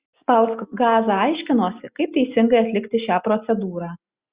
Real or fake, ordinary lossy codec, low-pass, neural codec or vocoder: real; Opus, 64 kbps; 3.6 kHz; none